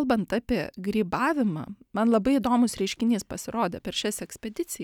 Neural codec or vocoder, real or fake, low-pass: none; real; 19.8 kHz